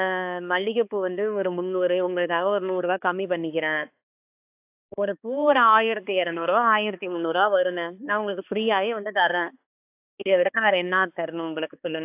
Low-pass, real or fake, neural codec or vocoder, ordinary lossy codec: 3.6 kHz; fake; codec, 16 kHz, 2 kbps, X-Codec, HuBERT features, trained on balanced general audio; AAC, 32 kbps